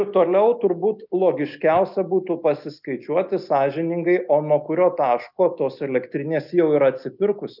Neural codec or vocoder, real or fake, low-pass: none; real; 5.4 kHz